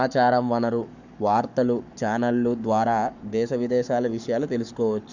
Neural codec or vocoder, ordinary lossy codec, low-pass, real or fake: codec, 44.1 kHz, 7.8 kbps, Pupu-Codec; none; 7.2 kHz; fake